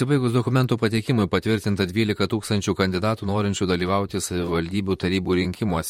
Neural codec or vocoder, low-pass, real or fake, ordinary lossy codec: vocoder, 44.1 kHz, 128 mel bands, Pupu-Vocoder; 19.8 kHz; fake; MP3, 64 kbps